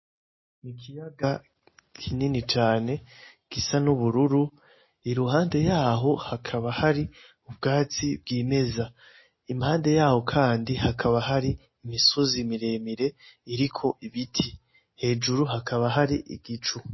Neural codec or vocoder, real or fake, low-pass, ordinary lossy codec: none; real; 7.2 kHz; MP3, 24 kbps